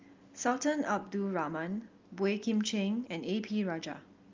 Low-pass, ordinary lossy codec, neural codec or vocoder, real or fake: 7.2 kHz; Opus, 32 kbps; none; real